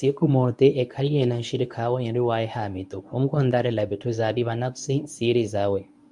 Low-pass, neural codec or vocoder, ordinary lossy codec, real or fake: 10.8 kHz; codec, 24 kHz, 0.9 kbps, WavTokenizer, medium speech release version 1; MP3, 96 kbps; fake